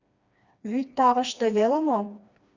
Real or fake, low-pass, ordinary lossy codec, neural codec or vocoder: fake; 7.2 kHz; Opus, 64 kbps; codec, 16 kHz, 2 kbps, FreqCodec, smaller model